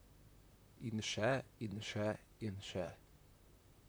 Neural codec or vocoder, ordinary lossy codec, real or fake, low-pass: vocoder, 44.1 kHz, 128 mel bands, Pupu-Vocoder; none; fake; none